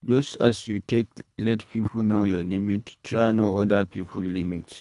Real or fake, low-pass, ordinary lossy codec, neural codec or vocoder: fake; 10.8 kHz; none; codec, 24 kHz, 1.5 kbps, HILCodec